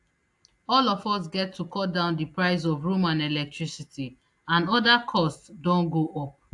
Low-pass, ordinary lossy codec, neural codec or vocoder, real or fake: 10.8 kHz; AAC, 64 kbps; none; real